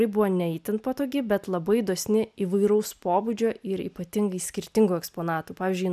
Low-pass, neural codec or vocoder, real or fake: 14.4 kHz; none; real